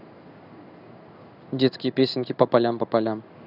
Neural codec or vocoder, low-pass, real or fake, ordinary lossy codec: codec, 16 kHz in and 24 kHz out, 1 kbps, XY-Tokenizer; 5.4 kHz; fake; none